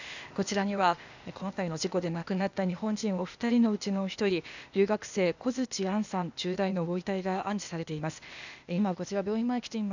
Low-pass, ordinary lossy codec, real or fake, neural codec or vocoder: 7.2 kHz; none; fake; codec, 16 kHz, 0.8 kbps, ZipCodec